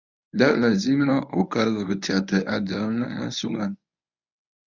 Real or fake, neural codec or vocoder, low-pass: fake; codec, 24 kHz, 0.9 kbps, WavTokenizer, medium speech release version 1; 7.2 kHz